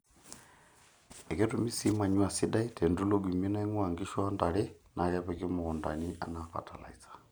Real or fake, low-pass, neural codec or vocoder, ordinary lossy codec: real; none; none; none